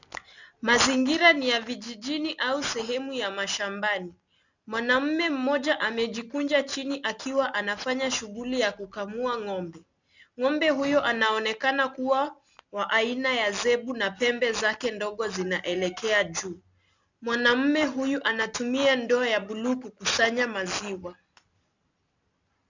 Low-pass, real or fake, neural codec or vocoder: 7.2 kHz; real; none